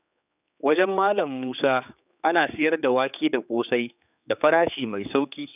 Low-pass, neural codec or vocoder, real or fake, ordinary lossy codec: 3.6 kHz; codec, 16 kHz, 4 kbps, X-Codec, HuBERT features, trained on general audio; fake; none